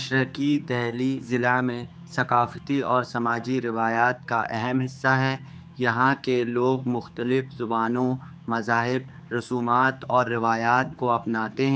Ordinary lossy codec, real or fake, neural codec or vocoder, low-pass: none; fake; codec, 16 kHz, 4 kbps, X-Codec, HuBERT features, trained on general audio; none